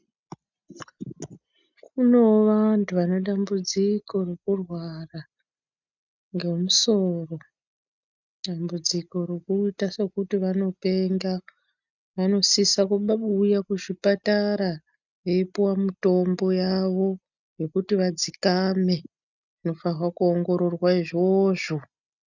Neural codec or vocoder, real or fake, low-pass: none; real; 7.2 kHz